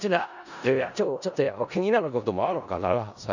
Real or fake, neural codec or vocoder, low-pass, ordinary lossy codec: fake; codec, 16 kHz in and 24 kHz out, 0.4 kbps, LongCat-Audio-Codec, four codebook decoder; 7.2 kHz; none